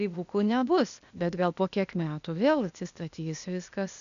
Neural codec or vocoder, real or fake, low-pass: codec, 16 kHz, 0.8 kbps, ZipCodec; fake; 7.2 kHz